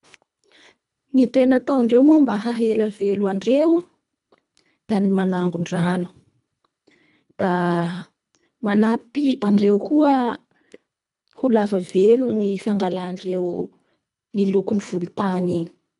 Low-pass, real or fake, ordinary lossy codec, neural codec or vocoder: 10.8 kHz; fake; none; codec, 24 kHz, 1.5 kbps, HILCodec